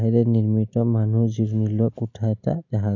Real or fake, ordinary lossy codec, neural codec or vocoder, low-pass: real; none; none; 7.2 kHz